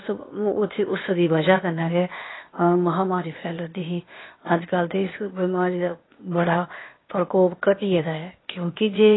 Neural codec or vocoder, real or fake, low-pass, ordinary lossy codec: codec, 16 kHz, 0.8 kbps, ZipCodec; fake; 7.2 kHz; AAC, 16 kbps